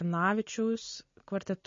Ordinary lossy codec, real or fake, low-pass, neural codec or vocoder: MP3, 32 kbps; real; 7.2 kHz; none